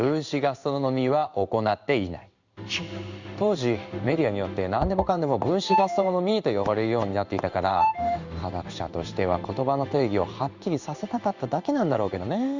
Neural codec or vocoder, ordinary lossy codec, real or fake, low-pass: codec, 16 kHz in and 24 kHz out, 1 kbps, XY-Tokenizer; Opus, 64 kbps; fake; 7.2 kHz